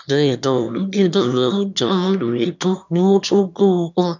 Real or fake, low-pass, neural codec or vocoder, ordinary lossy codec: fake; 7.2 kHz; autoencoder, 22.05 kHz, a latent of 192 numbers a frame, VITS, trained on one speaker; none